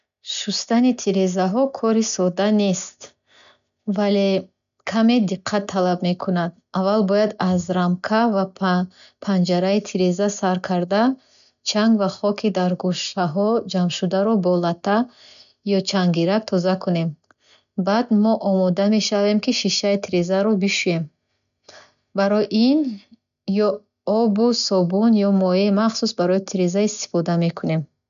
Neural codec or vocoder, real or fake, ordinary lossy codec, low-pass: none; real; none; 7.2 kHz